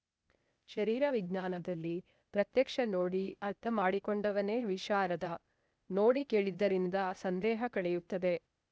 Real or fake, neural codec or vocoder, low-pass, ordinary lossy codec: fake; codec, 16 kHz, 0.8 kbps, ZipCodec; none; none